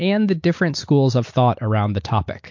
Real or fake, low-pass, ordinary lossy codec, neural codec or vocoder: real; 7.2 kHz; MP3, 48 kbps; none